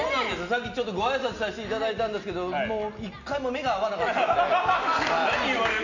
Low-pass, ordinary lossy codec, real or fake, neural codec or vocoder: 7.2 kHz; none; real; none